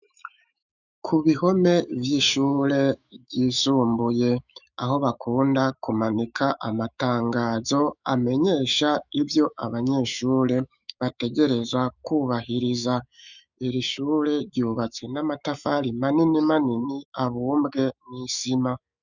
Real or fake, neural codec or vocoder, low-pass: fake; autoencoder, 48 kHz, 128 numbers a frame, DAC-VAE, trained on Japanese speech; 7.2 kHz